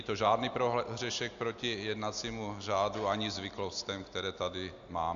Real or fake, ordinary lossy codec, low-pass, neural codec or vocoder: real; Opus, 64 kbps; 7.2 kHz; none